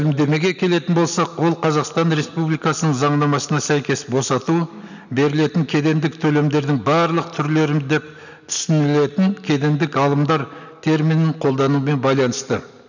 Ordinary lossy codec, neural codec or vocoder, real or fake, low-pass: none; none; real; 7.2 kHz